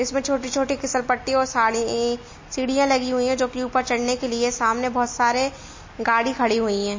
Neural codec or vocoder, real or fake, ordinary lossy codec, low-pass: none; real; MP3, 32 kbps; 7.2 kHz